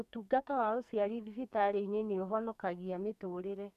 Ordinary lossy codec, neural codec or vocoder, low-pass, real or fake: MP3, 96 kbps; codec, 44.1 kHz, 2.6 kbps, SNAC; 14.4 kHz; fake